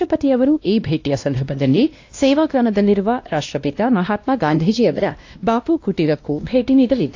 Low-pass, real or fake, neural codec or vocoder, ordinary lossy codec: 7.2 kHz; fake; codec, 16 kHz, 1 kbps, X-Codec, WavLM features, trained on Multilingual LibriSpeech; AAC, 48 kbps